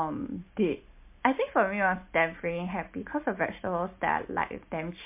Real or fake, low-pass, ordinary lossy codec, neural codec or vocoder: real; 3.6 kHz; MP3, 24 kbps; none